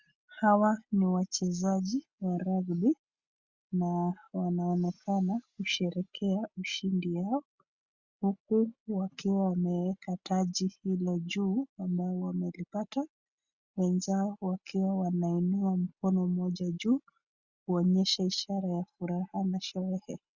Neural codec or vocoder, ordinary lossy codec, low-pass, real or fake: none; Opus, 64 kbps; 7.2 kHz; real